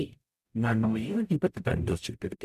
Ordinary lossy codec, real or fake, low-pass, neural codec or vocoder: none; fake; 14.4 kHz; codec, 44.1 kHz, 0.9 kbps, DAC